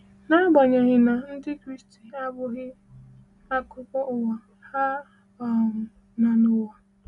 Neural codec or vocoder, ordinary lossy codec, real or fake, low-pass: none; none; real; 10.8 kHz